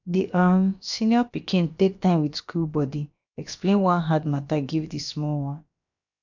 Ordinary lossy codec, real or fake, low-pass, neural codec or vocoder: none; fake; 7.2 kHz; codec, 16 kHz, about 1 kbps, DyCAST, with the encoder's durations